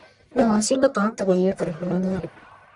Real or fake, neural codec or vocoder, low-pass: fake; codec, 44.1 kHz, 1.7 kbps, Pupu-Codec; 10.8 kHz